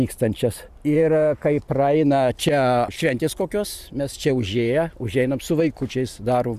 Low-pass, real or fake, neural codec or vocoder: 14.4 kHz; fake; vocoder, 44.1 kHz, 128 mel bands every 512 samples, BigVGAN v2